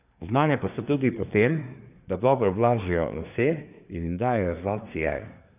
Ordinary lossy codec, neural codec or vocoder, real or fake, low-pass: none; codec, 24 kHz, 1 kbps, SNAC; fake; 3.6 kHz